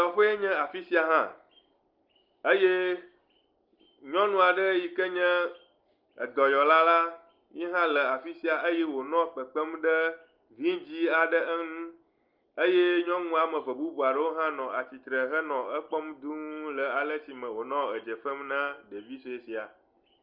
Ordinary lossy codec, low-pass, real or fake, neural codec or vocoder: Opus, 32 kbps; 5.4 kHz; real; none